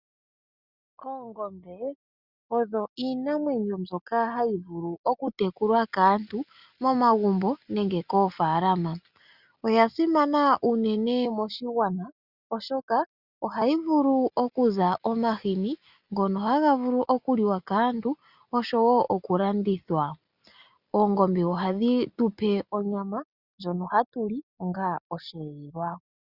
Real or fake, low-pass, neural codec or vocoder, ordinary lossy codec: real; 5.4 kHz; none; Opus, 64 kbps